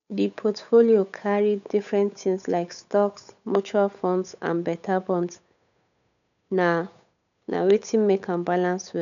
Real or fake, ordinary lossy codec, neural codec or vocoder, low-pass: fake; none; codec, 16 kHz, 8 kbps, FunCodec, trained on Chinese and English, 25 frames a second; 7.2 kHz